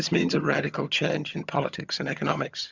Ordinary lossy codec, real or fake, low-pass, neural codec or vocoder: Opus, 64 kbps; fake; 7.2 kHz; vocoder, 22.05 kHz, 80 mel bands, HiFi-GAN